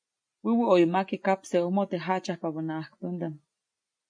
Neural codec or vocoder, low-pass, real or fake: none; 9.9 kHz; real